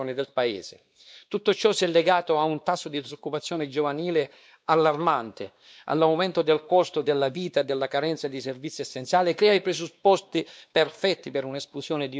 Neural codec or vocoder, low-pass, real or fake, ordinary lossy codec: codec, 16 kHz, 2 kbps, X-Codec, WavLM features, trained on Multilingual LibriSpeech; none; fake; none